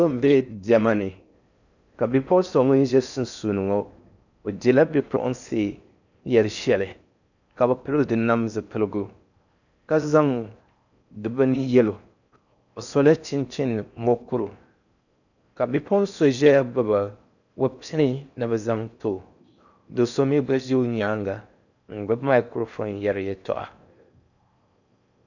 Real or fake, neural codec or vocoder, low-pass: fake; codec, 16 kHz in and 24 kHz out, 0.6 kbps, FocalCodec, streaming, 4096 codes; 7.2 kHz